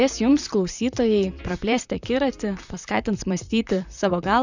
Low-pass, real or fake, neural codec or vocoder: 7.2 kHz; fake; vocoder, 44.1 kHz, 128 mel bands, Pupu-Vocoder